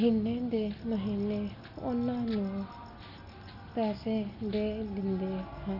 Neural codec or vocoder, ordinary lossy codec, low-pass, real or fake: none; none; 5.4 kHz; real